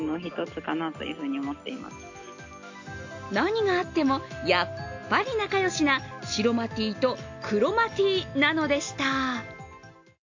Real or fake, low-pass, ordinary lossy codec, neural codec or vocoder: real; 7.2 kHz; AAC, 48 kbps; none